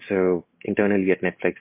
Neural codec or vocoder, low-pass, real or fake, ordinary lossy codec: none; 3.6 kHz; real; MP3, 24 kbps